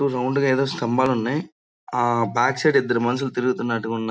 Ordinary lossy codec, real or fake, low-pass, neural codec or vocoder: none; real; none; none